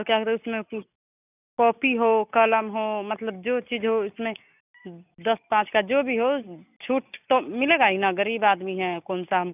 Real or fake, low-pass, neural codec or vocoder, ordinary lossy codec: real; 3.6 kHz; none; none